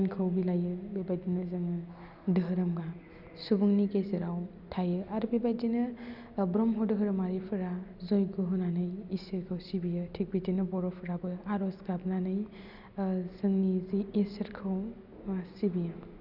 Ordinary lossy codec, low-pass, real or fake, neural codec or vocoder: none; 5.4 kHz; real; none